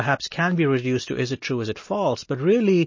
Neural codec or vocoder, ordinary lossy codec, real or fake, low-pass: none; MP3, 32 kbps; real; 7.2 kHz